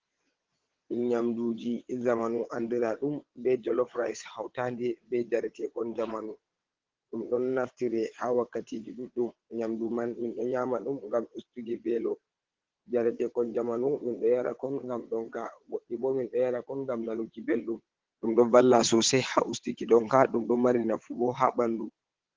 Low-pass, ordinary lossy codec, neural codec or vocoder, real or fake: 7.2 kHz; Opus, 16 kbps; vocoder, 22.05 kHz, 80 mel bands, WaveNeXt; fake